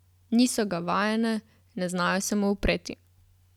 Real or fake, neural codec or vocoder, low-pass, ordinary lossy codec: real; none; 19.8 kHz; none